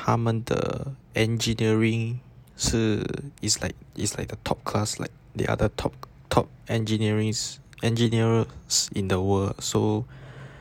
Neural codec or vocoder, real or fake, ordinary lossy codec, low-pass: none; real; none; 19.8 kHz